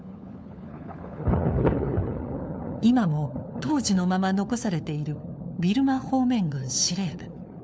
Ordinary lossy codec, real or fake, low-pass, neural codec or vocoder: none; fake; none; codec, 16 kHz, 4 kbps, FunCodec, trained on LibriTTS, 50 frames a second